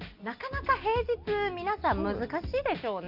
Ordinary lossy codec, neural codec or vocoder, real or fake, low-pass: Opus, 24 kbps; none; real; 5.4 kHz